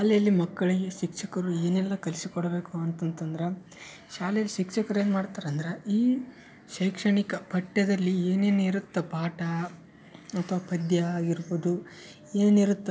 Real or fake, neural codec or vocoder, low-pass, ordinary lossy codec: real; none; none; none